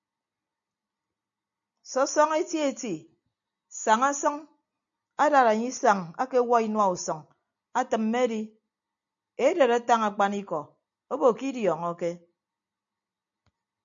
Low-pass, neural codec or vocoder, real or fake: 7.2 kHz; none; real